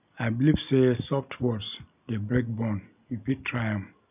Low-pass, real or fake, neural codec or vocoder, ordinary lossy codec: 3.6 kHz; real; none; none